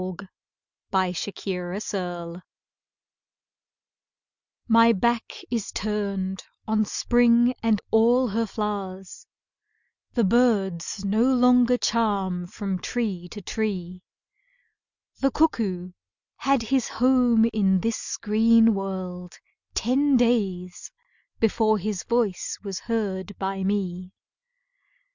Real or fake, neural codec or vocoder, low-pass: real; none; 7.2 kHz